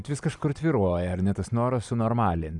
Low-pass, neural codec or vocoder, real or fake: 10.8 kHz; none; real